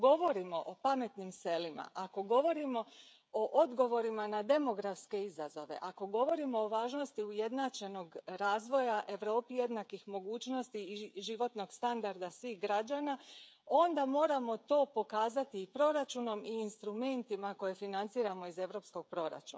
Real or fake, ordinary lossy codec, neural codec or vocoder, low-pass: fake; none; codec, 16 kHz, 16 kbps, FreqCodec, smaller model; none